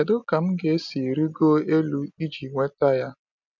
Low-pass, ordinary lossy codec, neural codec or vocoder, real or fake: 7.2 kHz; none; none; real